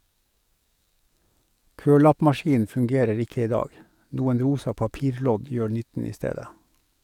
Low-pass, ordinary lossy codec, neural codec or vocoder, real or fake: 19.8 kHz; none; codec, 44.1 kHz, 7.8 kbps, DAC; fake